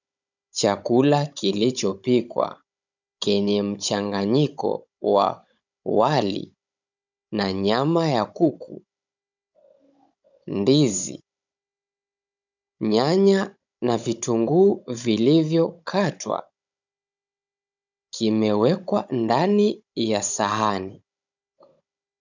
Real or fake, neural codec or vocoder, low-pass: fake; codec, 16 kHz, 16 kbps, FunCodec, trained on Chinese and English, 50 frames a second; 7.2 kHz